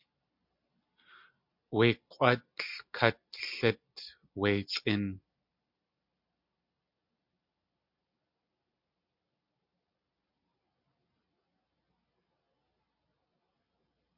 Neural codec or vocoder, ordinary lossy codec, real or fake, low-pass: none; MP3, 48 kbps; real; 5.4 kHz